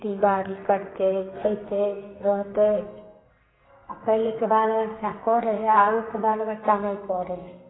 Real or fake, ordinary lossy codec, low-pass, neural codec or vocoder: fake; AAC, 16 kbps; 7.2 kHz; codec, 32 kHz, 1.9 kbps, SNAC